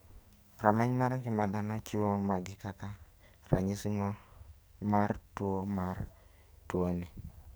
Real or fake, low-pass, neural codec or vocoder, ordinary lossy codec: fake; none; codec, 44.1 kHz, 2.6 kbps, SNAC; none